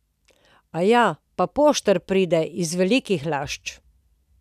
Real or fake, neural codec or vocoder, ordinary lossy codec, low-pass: real; none; none; 14.4 kHz